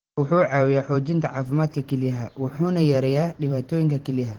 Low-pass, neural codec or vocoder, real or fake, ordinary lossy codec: 19.8 kHz; vocoder, 44.1 kHz, 128 mel bands every 512 samples, BigVGAN v2; fake; Opus, 16 kbps